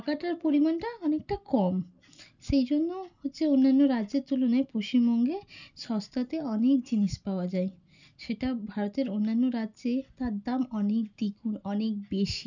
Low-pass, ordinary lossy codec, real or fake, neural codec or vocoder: 7.2 kHz; none; real; none